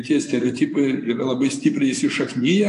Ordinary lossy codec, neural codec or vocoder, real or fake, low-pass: AAC, 48 kbps; vocoder, 24 kHz, 100 mel bands, Vocos; fake; 10.8 kHz